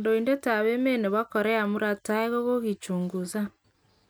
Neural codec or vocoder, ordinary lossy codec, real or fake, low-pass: none; none; real; none